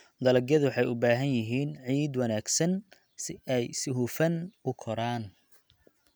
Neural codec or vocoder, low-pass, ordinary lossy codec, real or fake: none; none; none; real